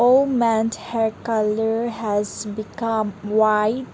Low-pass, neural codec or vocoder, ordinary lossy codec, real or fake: none; none; none; real